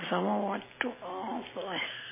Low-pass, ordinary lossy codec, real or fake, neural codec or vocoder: 3.6 kHz; MP3, 16 kbps; real; none